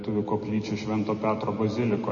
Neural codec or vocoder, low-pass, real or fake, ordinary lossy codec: none; 7.2 kHz; real; MP3, 32 kbps